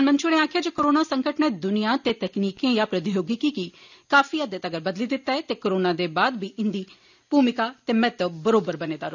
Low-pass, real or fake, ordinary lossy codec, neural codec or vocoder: 7.2 kHz; real; none; none